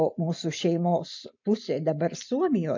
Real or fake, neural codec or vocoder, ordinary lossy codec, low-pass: real; none; MP3, 48 kbps; 7.2 kHz